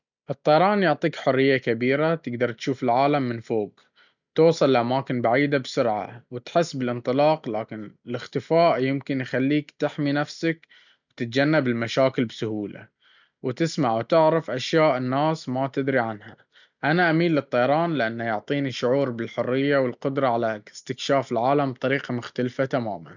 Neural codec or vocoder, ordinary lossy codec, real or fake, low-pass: none; none; real; 7.2 kHz